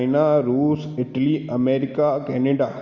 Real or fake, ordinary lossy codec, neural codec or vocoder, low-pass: real; Opus, 64 kbps; none; 7.2 kHz